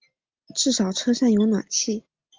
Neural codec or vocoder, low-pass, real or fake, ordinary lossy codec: none; 7.2 kHz; real; Opus, 32 kbps